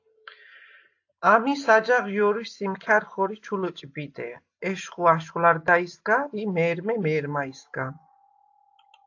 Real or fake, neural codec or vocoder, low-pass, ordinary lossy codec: real; none; 7.2 kHz; AAC, 48 kbps